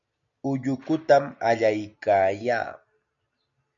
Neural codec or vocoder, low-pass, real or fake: none; 7.2 kHz; real